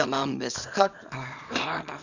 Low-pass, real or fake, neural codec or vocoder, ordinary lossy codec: 7.2 kHz; fake; codec, 24 kHz, 0.9 kbps, WavTokenizer, small release; none